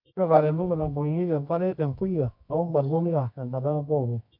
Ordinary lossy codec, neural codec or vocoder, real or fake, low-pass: MP3, 48 kbps; codec, 24 kHz, 0.9 kbps, WavTokenizer, medium music audio release; fake; 5.4 kHz